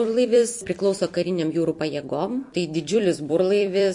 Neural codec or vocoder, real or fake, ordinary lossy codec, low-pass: vocoder, 44.1 kHz, 128 mel bands every 256 samples, BigVGAN v2; fake; MP3, 48 kbps; 10.8 kHz